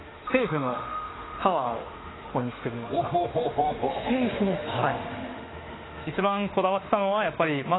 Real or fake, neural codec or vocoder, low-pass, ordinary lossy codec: fake; autoencoder, 48 kHz, 32 numbers a frame, DAC-VAE, trained on Japanese speech; 7.2 kHz; AAC, 16 kbps